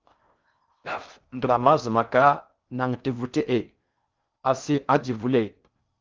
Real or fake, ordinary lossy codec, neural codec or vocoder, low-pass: fake; Opus, 24 kbps; codec, 16 kHz in and 24 kHz out, 0.6 kbps, FocalCodec, streaming, 4096 codes; 7.2 kHz